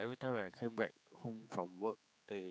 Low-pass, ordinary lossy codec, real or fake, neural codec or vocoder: none; none; fake; codec, 16 kHz, 4 kbps, X-Codec, HuBERT features, trained on general audio